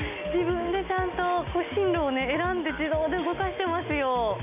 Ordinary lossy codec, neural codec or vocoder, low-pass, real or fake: none; none; 3.6 kHz; real